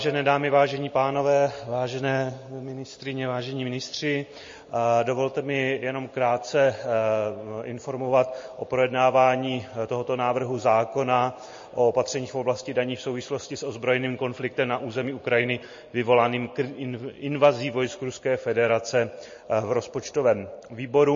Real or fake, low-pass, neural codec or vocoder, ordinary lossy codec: real; 7.2 kHz; none; MP3, 32 kbps